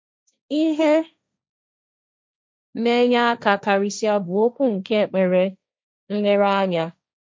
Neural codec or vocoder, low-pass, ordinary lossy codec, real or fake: codec, 16 kHz, 1.1 kbps, Voila-Tokenizer; none; none; fake